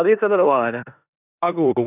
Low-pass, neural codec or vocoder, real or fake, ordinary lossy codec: 3.6 kHz; codec, 16 kHz in and 24 kHz out, 0.9 kbps, LongCat-Audio-Codec, four codebook decoder; fake; none